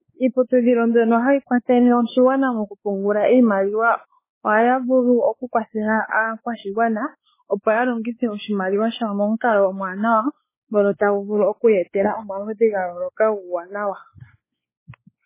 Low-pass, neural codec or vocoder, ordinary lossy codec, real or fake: 3.6 kHz; codec, 16 kHz, 4 kbps, X-Codec, WavLM features, trained on Multilingual LibriSpeech; MP3, 16 kbps; fake